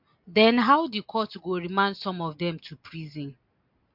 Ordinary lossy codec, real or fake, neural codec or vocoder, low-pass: MP3, 48 kbps; real; none; 5.4 kHz